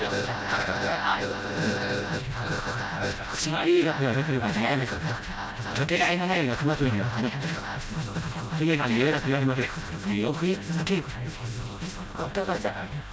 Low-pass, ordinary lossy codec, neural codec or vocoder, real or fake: none; none; codec, 16 kHz, 0.5 kbps, FreqCodec, smaller model; fake